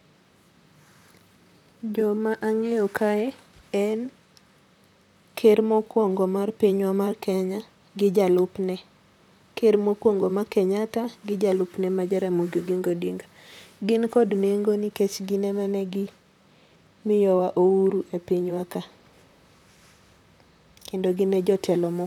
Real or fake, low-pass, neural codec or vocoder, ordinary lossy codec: fake; 19.8 kHz; vocoder, 44.1 kHz, 128 mel bands, Pupu-Vocoder; none